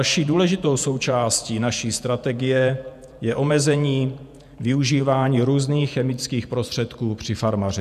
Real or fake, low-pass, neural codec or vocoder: fake; 14.4 kHz; vocoder, 48 kHz, 128 mel bands, Vocos